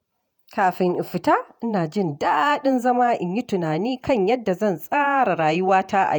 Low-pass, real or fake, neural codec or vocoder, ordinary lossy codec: 19.8 kHz; fake; vocoder, 48 kHz, 128 mel bands, Vocos; none